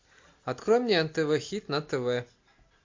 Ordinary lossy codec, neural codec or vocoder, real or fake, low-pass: MP3, 48 kbps; none; real; 7.2 kHz